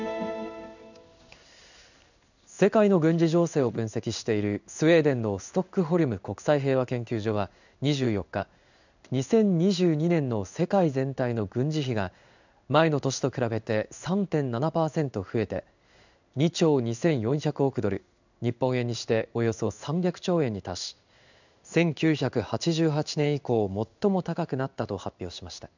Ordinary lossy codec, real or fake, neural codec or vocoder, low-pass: none; fake; codec, 16 kHz in and 24 kHz out, 1 kbps, XY-Tokenizer; 7.2 kHz